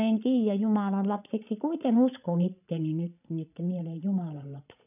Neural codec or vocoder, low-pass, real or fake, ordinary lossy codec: codec, 16 kHz, 16 kbps, FreqCodec, larger model; 3.6 kHz; fake; none